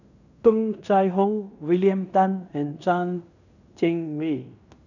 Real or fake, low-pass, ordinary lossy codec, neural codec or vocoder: fake; 7.2 kHz; none; codec, 16 kHz in and 24 kHz out, 0.9 kbps, LongCat-Audio-Codec, fine tuned four codebook decoder